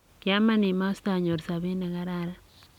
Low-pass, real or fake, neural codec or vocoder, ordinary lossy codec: 19.8 kHz; real; none; none